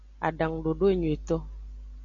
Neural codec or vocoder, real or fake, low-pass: none; real; 7.2 kHz